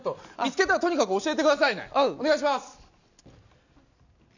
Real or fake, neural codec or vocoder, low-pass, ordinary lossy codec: real; none; 7.2 kHz; none